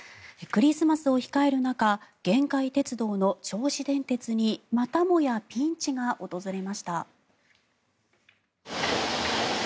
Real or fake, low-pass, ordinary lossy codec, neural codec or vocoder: real; none; none; none